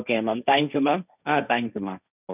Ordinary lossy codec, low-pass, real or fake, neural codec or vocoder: none; 3.6 kHz; fake; codec, 16 kHz, 1.1 kbps, Voila-Tokenizer